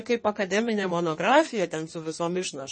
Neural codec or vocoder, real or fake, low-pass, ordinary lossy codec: codec, 16 kHz in and 24 kHz out, 1.1 kbps, FireRedTTS-2 codec; fake; 9.9 kHz; MP3, 32 kbps